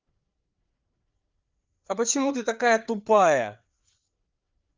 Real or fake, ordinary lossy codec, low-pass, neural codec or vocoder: fake; Opus, 24 kbps; 7.2 kHz; codec, 16 kHz, 4 kbps, FreqCodec, larger model